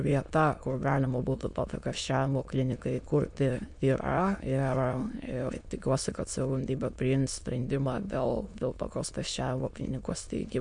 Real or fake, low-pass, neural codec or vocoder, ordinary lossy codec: fake; 9.9 kHz; autoencoder, 22.05 kHz, a latent of 192 numbers a frame, VITS, trained on many speakers; MP3, 64 kbps